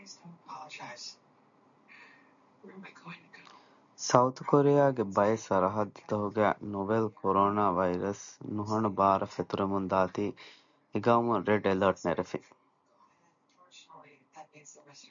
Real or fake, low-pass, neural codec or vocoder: real; 7.2 kHz; none